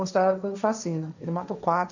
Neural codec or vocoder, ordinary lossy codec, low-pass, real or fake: codec, 16 kHz, 1.1 kbps, Voila-Tokenizer; none; 7.2 kHz; fake